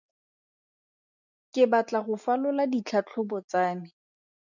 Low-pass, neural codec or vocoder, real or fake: 7.2 kHz; none; real